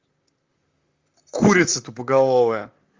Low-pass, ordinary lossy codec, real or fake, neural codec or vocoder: 7.2 kHz; Opus, 32 kbps; fake; vocoder, 44.1 kHz, 128 mel bands, Pupu-Vocoder